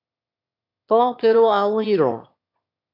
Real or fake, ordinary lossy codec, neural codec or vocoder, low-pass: fake; MP3, 48 kbps; autoencoder, 22.05 kHz, a latent of 192 numbers a frame, VITS, trained on one speaker; 5.4 kHz